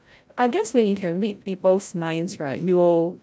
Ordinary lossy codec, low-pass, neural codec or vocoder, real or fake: none; none; codec, 16 kHz, 0.5 kbps, FreqCodec, larger model; fake